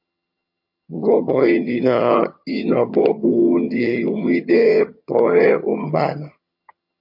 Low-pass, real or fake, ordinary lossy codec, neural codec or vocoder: 5.4 kHz; fake; MP3, 32 kbps; vocoder, 22.05 kHz, 80 mel bands, HiFi-GAN